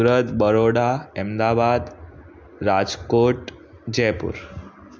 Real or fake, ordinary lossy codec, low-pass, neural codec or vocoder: real; none; none; none